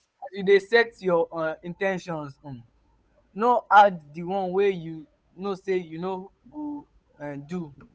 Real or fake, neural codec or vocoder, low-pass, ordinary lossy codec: fake; codec, 16 kHz, 8 kbps, FunCodec, trained on Chinese and English, 25 frames a second; none; none